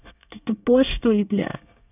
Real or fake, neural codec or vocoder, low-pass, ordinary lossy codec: fake; codec, 24 kHz, 1 kbps, SNAC; 3.6 kHz; none